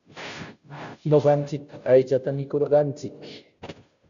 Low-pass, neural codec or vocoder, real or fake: 7.2 kHz; codec, 16 kHz, 0.5 kbps, FunCodec, trained on Chinese and English, 25 frames a second; fake